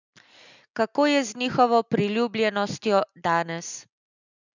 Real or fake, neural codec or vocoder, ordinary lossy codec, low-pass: real; none; none; 7.2 kHz